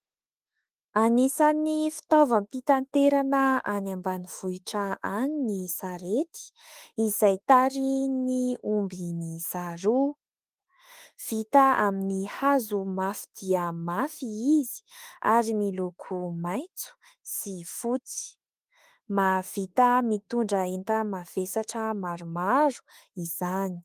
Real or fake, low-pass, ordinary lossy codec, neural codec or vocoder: fake; 14.4 kHz; Opus, 24 kbps; autoencoder, 48 kHz, 32 numbers a frame, DAC-VAE, trained on Japanese speech